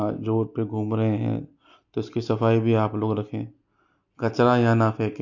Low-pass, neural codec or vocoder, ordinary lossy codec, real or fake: 7.2 kHz; none; MP3, 48 kbps; real